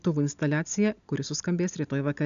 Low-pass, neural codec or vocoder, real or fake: 7.2 kHz; none; real